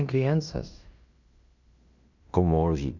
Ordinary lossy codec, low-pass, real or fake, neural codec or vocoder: none; 7.2 kHz; fake; codec, 16 kHz in and 24 kHz out, 0.9 kbps, LongCat-Audio-Codec, fine tuned four codebook decoder